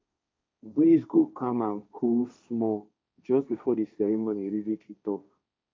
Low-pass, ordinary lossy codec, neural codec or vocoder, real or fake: none; none; codec, 16 kHz, 1.1 kbps, Voila-Tokenizer; fake